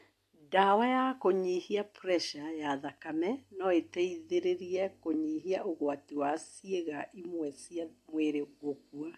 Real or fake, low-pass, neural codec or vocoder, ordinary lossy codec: real; 14.4 kHz; none; AAC, 64 kbps